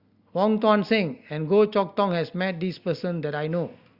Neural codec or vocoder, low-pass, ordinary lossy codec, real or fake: none; 5.4 kHz; Opus, 64 kbps; real